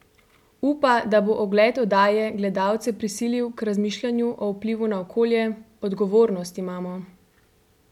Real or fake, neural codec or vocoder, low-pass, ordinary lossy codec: real; none; 19.8 kHz; none